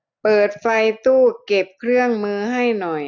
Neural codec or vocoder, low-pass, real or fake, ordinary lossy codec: none; 7.2 kHz; real; none